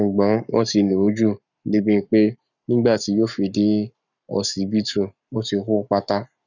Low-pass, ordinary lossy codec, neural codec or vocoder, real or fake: 7.2 kHz; none; codec, 44.1 kHz, 7.8 kbps, Pupu-Codec; fake